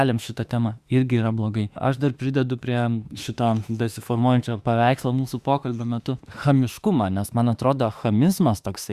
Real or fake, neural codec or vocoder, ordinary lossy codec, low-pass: fake; autoencoder, 48 kHz, 32 numbers a frame, DAC-VAE, trained on Japanese speech; Opus, 64 kbps; 14.4 kHz